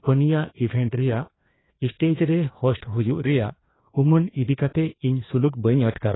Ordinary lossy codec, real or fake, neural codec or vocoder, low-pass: AAC, 16 kbps; fake; codec, 16 kHz, 2 kbps, FreqCodec, larger model; 7.2 kHz